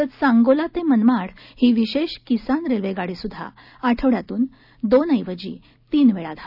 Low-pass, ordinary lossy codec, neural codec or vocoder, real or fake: 5.4 kHz; none; none; real